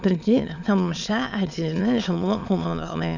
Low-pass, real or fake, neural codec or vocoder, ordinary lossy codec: 7.2 kHz; fake; autoencoder, 22.05 kHz, a latent of 192 numbers a frame, VITS, trained on many speakers; none